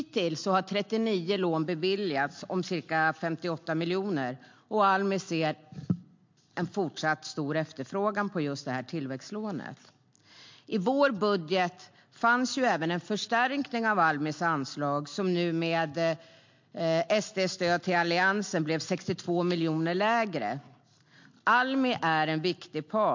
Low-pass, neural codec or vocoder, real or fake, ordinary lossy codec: 7.2 kHz; none; real; MP3, 48 kbps